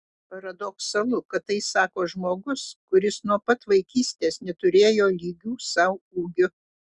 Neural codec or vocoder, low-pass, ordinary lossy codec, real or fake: none; 10.8 kHz; Opus, 64 kbps; real